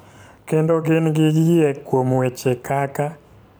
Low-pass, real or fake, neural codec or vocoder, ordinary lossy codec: none; fake; vocoder, 44.1 kHz, 128 mel bands every 512 samples, BigVGAN v2; none